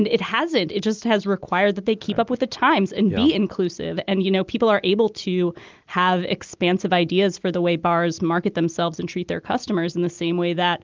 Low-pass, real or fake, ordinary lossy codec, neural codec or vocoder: 7.2 kHz; real; Opus, 32 kbps; none